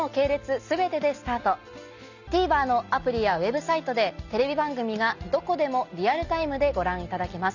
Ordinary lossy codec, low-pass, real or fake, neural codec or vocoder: none; 7.2 kHz; real; none